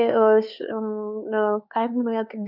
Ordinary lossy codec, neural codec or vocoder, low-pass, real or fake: none; codec, 16 kHz, 4 kbps, X-Codec, HuBERT features, trained on LibriSpeech; 5.4 kHz; fake